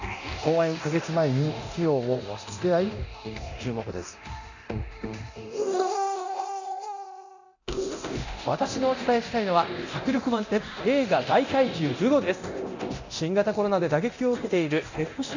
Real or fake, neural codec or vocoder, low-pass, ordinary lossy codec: fake; codec, 24 kHz, 0.9 kbps, DualCodec; 7.2 kHz; none